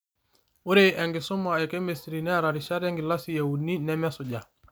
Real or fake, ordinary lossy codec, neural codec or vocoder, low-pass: real; none; none; none